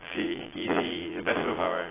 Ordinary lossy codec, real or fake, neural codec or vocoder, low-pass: AAC, 16 kbps; fake; vocoder, 22.05 kHz, 80 mel bands, Vocos; 3.6 kHz